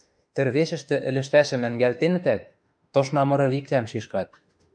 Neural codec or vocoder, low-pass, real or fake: autoencoder, 48 kHz, 32 numbers a frame, DAC-VAE, trained on Japanese speech; 9.9 kHz; fake